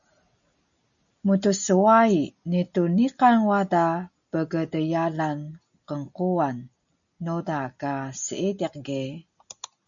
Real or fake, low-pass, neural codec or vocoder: real; 7.2 kHz; none